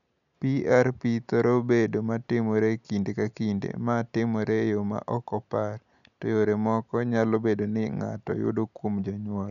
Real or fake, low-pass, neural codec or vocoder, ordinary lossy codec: real; 7.2 kHz; none; none